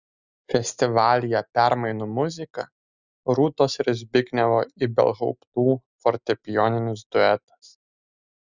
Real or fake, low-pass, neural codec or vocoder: real; 7.2 kHz; none